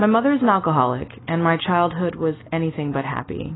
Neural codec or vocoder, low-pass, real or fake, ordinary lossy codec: none; 7.2 kHz; real; AAC, 16 kbps